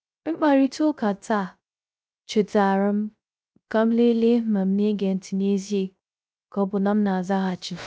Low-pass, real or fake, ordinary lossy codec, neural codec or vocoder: none; fake; none; codec, 16 kHz, 0.3 kbps, FocalCodec